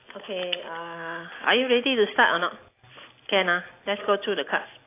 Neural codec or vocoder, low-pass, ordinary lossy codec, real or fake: none; 3.6 kHz; AAC, 24 kbps; real